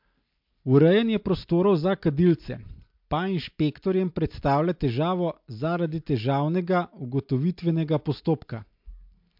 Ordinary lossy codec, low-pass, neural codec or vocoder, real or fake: MP3, 48 kbps; 5.4 kHz; none; real